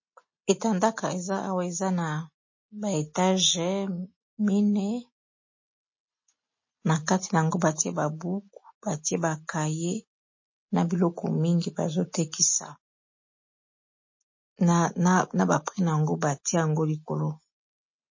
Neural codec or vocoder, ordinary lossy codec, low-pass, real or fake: none; MP3, 32 kbps; 7.2 kHz; real